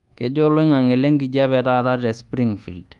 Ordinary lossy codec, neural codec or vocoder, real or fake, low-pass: Opus, 32 kbps; codec, 24 kHz, 1.2 kbps, DualCodec; fake; 10.8 kHz